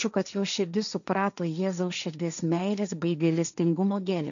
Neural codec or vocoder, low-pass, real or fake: codec, 16 kHz, 1.1 kbps, Voila-Tokenizer; 7.2 kHz; fake